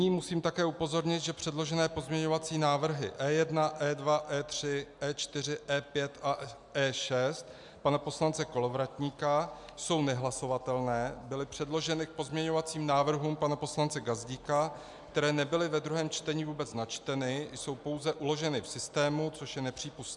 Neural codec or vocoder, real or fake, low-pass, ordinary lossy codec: none; real; 10.8 kHz; MP3, 96 kbps